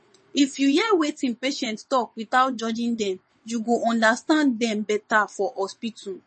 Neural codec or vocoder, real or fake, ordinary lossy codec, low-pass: vocoder, 22.05 kHz, 80 mel bands, WaveNeXt; fake; MP3, 32 kbps; 9.9 kHz